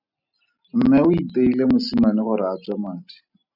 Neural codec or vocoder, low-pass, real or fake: none; 5.4 kHz; real